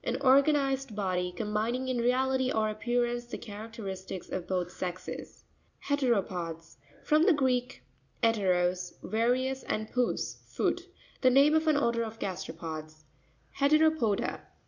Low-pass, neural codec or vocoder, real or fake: 7.2 kHz; none; real